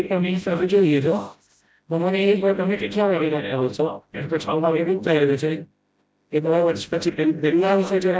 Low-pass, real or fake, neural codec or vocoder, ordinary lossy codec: none; fake; codec, 16 kHz, 0.5 kbps, FreqCodec, smaller model; none